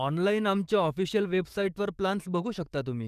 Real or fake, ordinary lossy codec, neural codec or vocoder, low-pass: fake; none; codec, 44.1 kHz, 7.8 kbps, DAC; 14.4 kHz